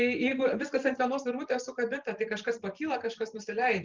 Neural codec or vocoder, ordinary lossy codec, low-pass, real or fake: vocoder, 44.1 kHz, 128 mel bands every 512 samples, BigVGAN v2; Opus, 32 kbps; 7.2 kHz; fake